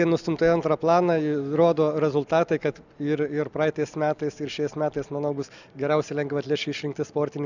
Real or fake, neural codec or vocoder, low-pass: real; none; 7.2 kHz